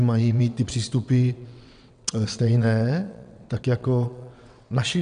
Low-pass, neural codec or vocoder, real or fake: 9.9 kHz; vocoder, 22.05 kHz, 80 mel bands, WaveNeXt; fake